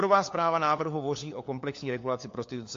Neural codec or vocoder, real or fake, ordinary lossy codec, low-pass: codec, 16 kHz, 4 kbps, FunCodec, trained on LibriTTS, 50 frames a second; fake; AAC, 48 kbps; 7.2 kHz